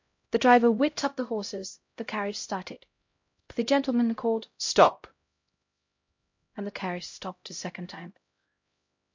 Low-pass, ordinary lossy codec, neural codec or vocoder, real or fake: 7.2 kHz; MP3, 48 kbps; codec, 16 kHz, 0.5 kbps, X-Codec, HuBERT features, trained on LibriSpeech; fake